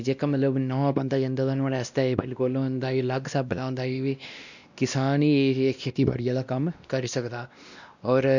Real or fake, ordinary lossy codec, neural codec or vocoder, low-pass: fake; none; codec, 16 kHz, 1 kbps, X-Codec, WavLM features, trained on Multilingual LibriSpeech; 7.2 kHz